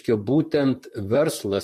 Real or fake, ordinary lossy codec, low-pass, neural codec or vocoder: fake; MP3, 64 kbps; 14.4 kHz; vocoder, 44.1 kHz, 128 mel bands, Pupu-Vocoder